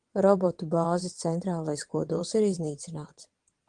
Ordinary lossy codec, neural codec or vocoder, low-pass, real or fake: Opus, 24 kbps; vocoder, 22.05 kHz, 80 mel bands, WaveNeXt; 9.9 kHz; fake